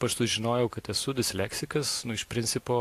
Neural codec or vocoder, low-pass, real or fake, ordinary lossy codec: none; 14.4 kHz; real; AAC, 64 kbps